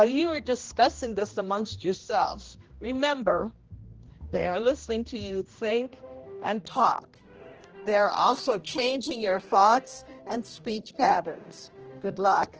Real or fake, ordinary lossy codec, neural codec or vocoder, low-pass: fake; Opus, 16 kbps; codec, 16 kHz, 1 kbps, X-Codec, HuBERT features, trained on general audio; 7.2 kHz